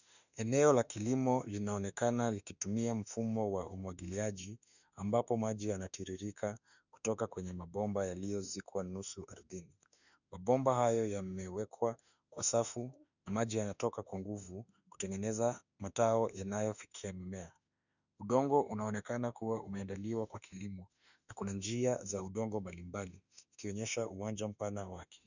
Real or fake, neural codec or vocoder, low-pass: fake; autoencoder, 48 kHz, 32 numbers a frame, DAC-VAE, trained on Japanese speech; 7.2 kHz